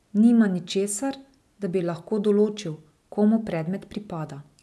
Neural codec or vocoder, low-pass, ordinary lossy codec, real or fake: none; none; none; real